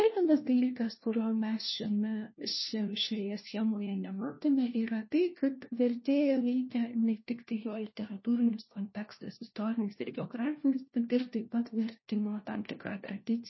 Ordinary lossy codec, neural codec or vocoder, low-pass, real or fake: MP3, 24 kbps; codec, 16 kHz, 1 kbps, FunCodec, trained on LibriTTS, 50 frames a second; 7.2 kHz; fake